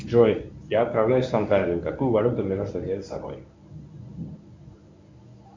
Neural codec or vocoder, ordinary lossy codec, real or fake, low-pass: codec, 24 kHz, 0.9 kbps, WavTokenizer, medium speech release version 1; MP3, 64 kbps; fake; 7.2 kHz